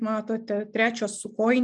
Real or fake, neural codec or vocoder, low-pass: real; none; 10.8 kHz